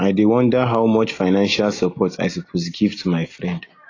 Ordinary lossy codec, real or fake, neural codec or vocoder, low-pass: AAC, 32 kbps; real; none; 7.2 kHz